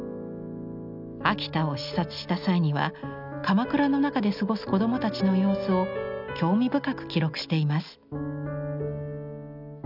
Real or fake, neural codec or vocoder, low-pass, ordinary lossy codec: real; none; 5.4 kHz; none